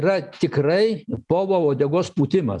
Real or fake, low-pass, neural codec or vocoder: real; 10.8 kHz; none